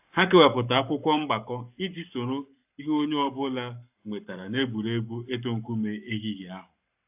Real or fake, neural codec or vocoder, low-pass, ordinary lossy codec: fake; codec, 44.1 kHz, 7.8 kbps, Pupu-Codec; 3.6 kHz; AAC, 32 kbps